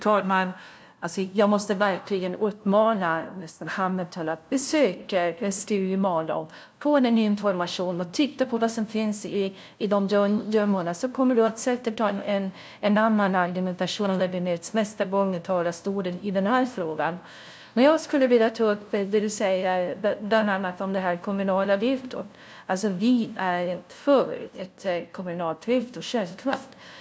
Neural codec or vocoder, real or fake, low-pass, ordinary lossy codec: codec, 16 kHz, 0.5 kbps, FunCodec, trained on LibriTTS, 25 frames a second; fake; none; none